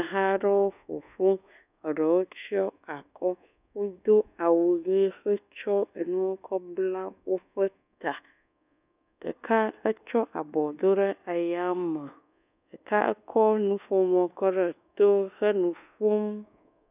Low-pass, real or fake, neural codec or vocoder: 3.6 kHz; fake; codec, 24 kHz, 1.2 kbps, DualCodec